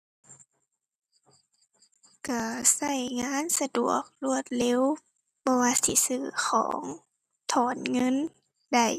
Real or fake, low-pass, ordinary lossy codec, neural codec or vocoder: real; 14.4 kHz; none; none